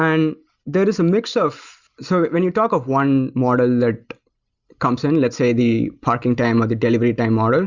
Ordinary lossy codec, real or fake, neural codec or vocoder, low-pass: Opus, 64 kbps; real; none; 7.2 kHz